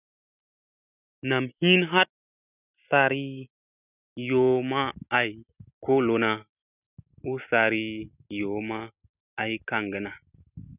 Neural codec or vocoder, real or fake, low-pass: none; real; 3.6 kHz